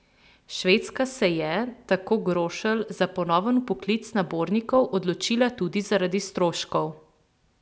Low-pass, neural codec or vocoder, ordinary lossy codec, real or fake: none; none; none; real